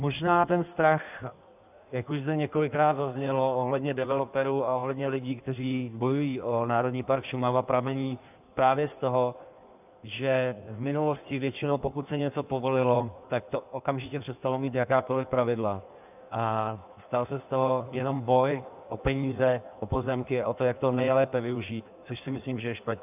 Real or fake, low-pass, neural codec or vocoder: fake; 3.6 kHz; codec, 16 kHz in and 24 kHz out, 1.1 kbps, FireRedTTS-2 codec